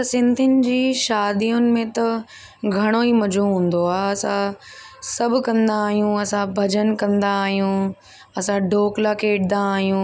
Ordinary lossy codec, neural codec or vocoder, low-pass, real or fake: none; none; none; real